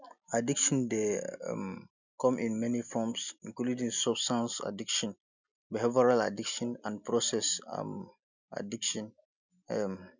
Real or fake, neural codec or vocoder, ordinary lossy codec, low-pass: real; none; none; 7.2 kHz